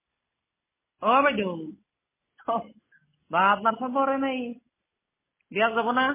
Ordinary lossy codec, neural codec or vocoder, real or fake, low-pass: MP3, 16 kbps; none; real; 3.6 kHz